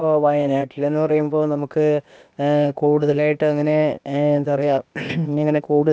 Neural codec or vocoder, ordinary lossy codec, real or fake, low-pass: codec, 16 kHz, 0.8 kbps, ZipCodec; none; fake; none